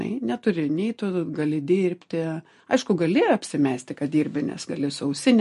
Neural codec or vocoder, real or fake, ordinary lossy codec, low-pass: none; real; MP3, 48 kbps; 14.4 kHz